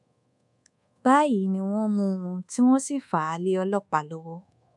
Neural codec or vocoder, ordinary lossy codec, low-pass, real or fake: codec, 24 kHz, 1.2 kbps, DualCodec; none; 10.8 kHz; fake